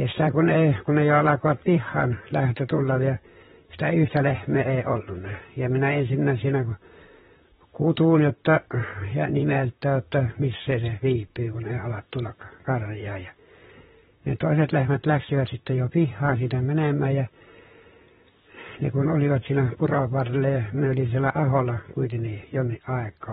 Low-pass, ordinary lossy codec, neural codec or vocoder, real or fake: 19.8 kHz; AAC, 16 kbps; vocoder, 44.1 kHz, 128 mel bands, Pupu-Vocoder; fake